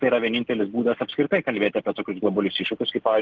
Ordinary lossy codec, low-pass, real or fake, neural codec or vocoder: Opus, 16 kbps; 7.2 kHz; real; none